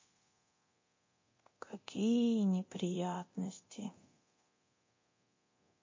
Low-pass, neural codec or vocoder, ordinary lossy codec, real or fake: 7.2 kHz; codec, 24 kHz, 0.9 kbps, DualCodec; MP3, 32 kbps; fake